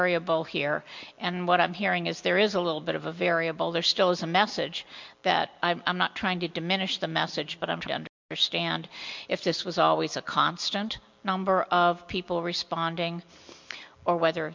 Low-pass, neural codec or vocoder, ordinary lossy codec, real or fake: 7.2 kHz; none; MP3, 64 kbps; real